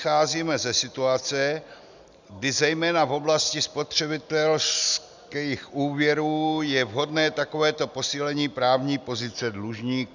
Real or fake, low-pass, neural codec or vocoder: real; 7.2 kHz; none